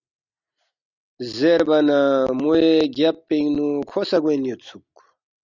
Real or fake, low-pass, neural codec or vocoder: real; 7.2 kHz; none